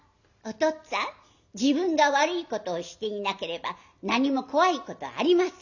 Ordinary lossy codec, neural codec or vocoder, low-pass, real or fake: none; none; 7.2 kHz; real